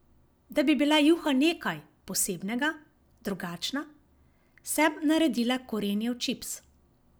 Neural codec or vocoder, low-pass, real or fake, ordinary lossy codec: none; none; real; none